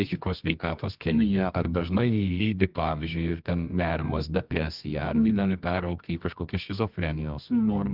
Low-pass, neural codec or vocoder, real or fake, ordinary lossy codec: 5.4 kHz; codec, 24 kHz, 0.9 kbps, WavTokenizer, medium music audio release; fake; Opus, 32 kbps